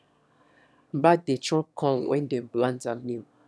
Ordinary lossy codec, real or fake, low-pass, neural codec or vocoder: none; fake; none; autoencoder, 22.05 kHz, a latent of 192 numbers a frame, VITS, trained on one speaker